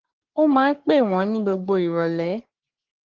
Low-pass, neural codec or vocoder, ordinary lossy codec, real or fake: 7.2 kHz; codec, 44.1 kHz, 3.4 kbps, Pupu-Codec; Opus, 16 kbps; fake